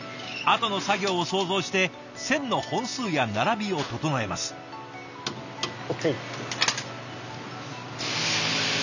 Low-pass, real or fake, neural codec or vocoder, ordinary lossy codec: 7.2 kHz; real; none; none